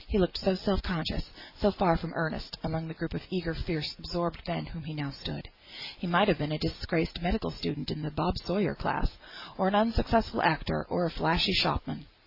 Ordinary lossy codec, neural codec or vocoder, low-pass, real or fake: AAC, 32 kbps; none; 5.4 kHz; real